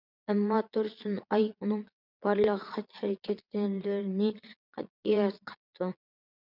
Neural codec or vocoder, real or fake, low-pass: vocoder, 22.05 kHz, 80 mel bands, Vocos; fake; 5.4 kHz